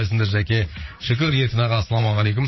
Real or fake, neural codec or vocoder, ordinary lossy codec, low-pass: fake; vocoder, 44.1 kHz, 80 mel bands, Vocos; MP3, 24 kbps; 7.2 kHz